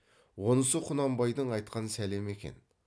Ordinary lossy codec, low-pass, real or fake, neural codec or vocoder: none; none; real; none